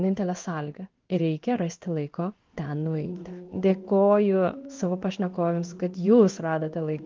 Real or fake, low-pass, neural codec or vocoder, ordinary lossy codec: fake; 7.2 kHz; codec, 16 kHz in and 24 kHz out, 1 kbps, XY-Tokenizer; Opus, 32 kbps